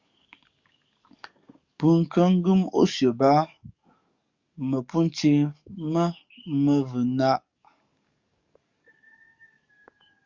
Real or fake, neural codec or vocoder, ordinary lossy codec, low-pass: fake; codec, 44.1 kHz, 7.8 kbps, DAC; Opus, 64 kbps; 7.2 kHz